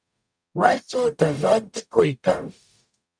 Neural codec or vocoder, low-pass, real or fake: codec, 44.1 kHz, 0.9 kbps, DAC; 9.9 kHz; fake